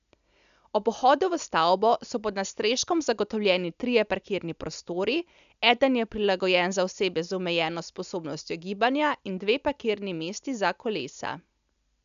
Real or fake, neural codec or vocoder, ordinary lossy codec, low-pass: real; none; none; 7.2 kHz